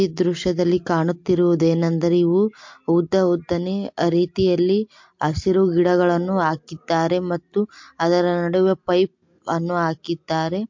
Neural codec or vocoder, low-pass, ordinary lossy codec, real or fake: none; 7.2 kHz; MP3, 48 kbps; real